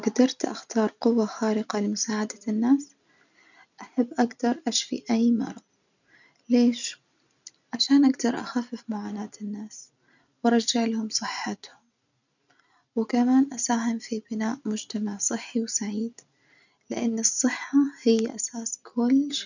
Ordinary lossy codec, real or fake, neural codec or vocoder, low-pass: none; real; none; 7.2 kHz